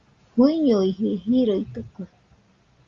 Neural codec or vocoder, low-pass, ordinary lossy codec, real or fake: none; 7.2 kHz; Opus, 32 kbps; real